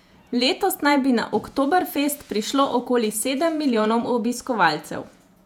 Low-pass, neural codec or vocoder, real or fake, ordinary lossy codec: 19.8 kHz; vocoder, 48 kHz, 128 mel bands, Vocos; fake; none